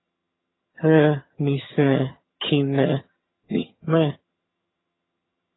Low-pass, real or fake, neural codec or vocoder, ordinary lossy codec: 7.2 kHz; fake; vocoder, 22.05 kHz, 80 mel bands, HiFi-GAN; AAC, 16 kbps